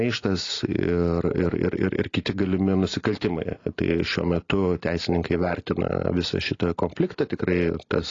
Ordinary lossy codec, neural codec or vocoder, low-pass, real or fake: AAC, 32 kbps; none; 7.2 kHz; real